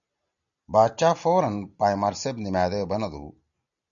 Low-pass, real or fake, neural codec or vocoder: 7.2 kHz; real; none